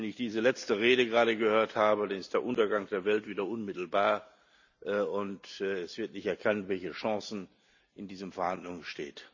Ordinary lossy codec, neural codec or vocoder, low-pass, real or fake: none; none; 7.2 kHz; real